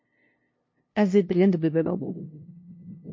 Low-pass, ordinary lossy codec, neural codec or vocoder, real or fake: 7.2 kHz; MP3, 32 kbps; codec, 16 kHz, 0.5 kbps, FunCodec, trained on LibriTTS, 25 frames a second; fake